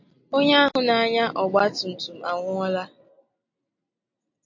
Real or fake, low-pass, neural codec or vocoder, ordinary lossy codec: real; 7.2 kHz; none; MP3, 48 kbps